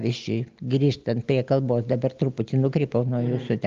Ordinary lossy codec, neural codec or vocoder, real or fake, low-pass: Opus, 24 kbps; none; real; 7.2 kHz